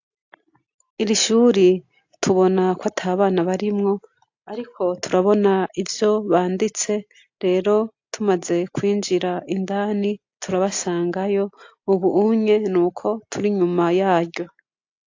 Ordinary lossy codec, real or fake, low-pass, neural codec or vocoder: AAC, 48 kbps; real; 7.2 kHz; none